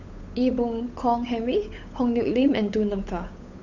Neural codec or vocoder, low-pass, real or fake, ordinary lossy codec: codec, 16 kHz, 8 kbps, FunCodec, trained on Chinese and English, 25 frames a second; 7.2 kHz; fake; none